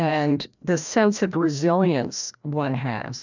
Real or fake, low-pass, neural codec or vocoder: fake; 7.2 kHz; codec, 16 kHz, 1 kbps, FreqCodec, larger model